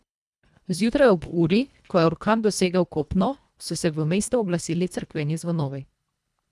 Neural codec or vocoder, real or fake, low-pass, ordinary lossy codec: codec, 24 kHz, 1.5 kbps, HILCodec; fake; none; none